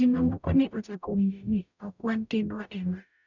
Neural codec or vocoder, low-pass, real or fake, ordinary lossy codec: codec, 44.1 kHz, 0.9 kbps, DAC; 7.2 kHz; fake; none